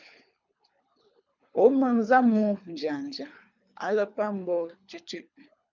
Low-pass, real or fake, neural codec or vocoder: 7.2 kHz; fake; codec, 24 kHz, 3 kbps, HILCodec